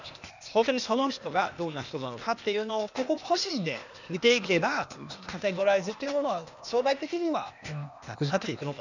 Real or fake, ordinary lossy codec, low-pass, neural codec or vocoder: fake; none; 7.2 kHz; codec, 16 kHz, 0.8 kbps, ZipCodec